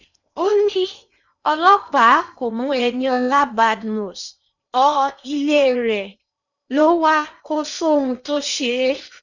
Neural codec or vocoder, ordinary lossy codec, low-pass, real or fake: codec, 16 kHz in and 24 kHz out, 0.8 kbps, FocalCodec, streaming, 65536 codes; none; 7.2 kHz; fake